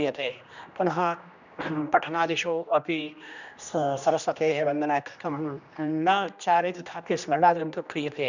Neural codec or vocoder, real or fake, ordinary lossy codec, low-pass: codec, 16 kHz, 1 kbps, X-Codec, HuBERT features, trained on general audio; fake; none; 7.2 kHz